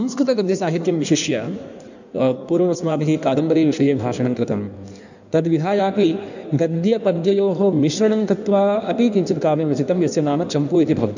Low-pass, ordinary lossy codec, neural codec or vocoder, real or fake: 7.2 kHz; none; codec, 16 kHz in and 24 kHz out, 1.1 kbps, FireRedTTS-2 codec; fake